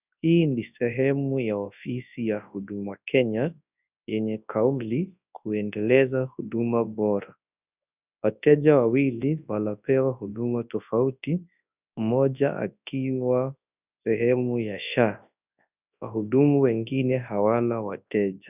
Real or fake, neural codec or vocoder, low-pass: fake; codec, 24 kHz, 0.9 kbps, WavTokenizer, large speech release; 3.6 kHz